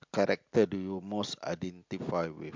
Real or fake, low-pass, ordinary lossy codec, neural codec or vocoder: real; 7.2 kHz; MP3, 64 kbps; none